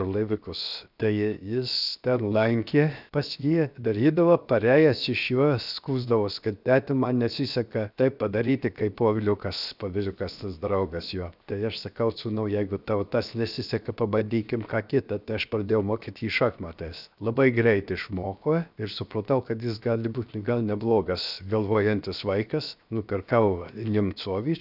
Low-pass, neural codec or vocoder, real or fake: 5.4 kHz; codec, 16 kHz, 0.7 kbps, FocalCodec; fake